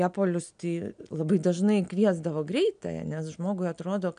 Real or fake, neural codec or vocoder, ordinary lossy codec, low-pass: real; none; AAC, 96 kbps; 9.9 kHz